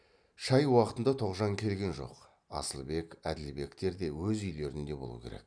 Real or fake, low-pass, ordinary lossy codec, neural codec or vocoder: real; 9.9 kHz; none; none